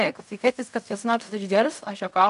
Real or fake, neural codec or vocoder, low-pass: fake; codec, 16 kHz in and 24 kHz out, 0.4 kbps, LongCat-Audio-Codec, fine tuned four codebook decoder; 10.8 kHz